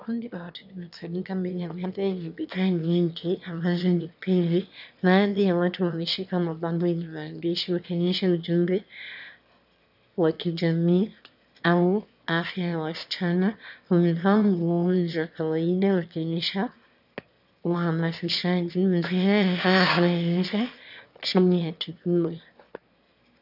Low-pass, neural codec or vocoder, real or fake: 5.4 kHz; autoencoder, 22.05 kHz, a latent of 192 numbers a frame, VITS, trained on one speaker; fake